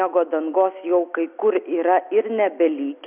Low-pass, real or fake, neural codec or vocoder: 3.6 kHz; real; none